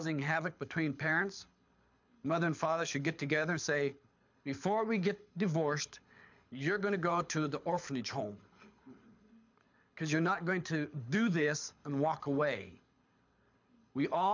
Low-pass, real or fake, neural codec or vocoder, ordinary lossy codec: 7.2 kHz; fake; codec, 24 kHz, 6 kbps, HILCodec; MP3, 64 kbps